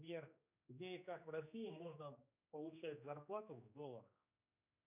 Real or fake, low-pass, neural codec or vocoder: fake; 3.6 kHz; codec, 16 kHz, 2 kbps, X-Codec, HuBERT features, trained on general audio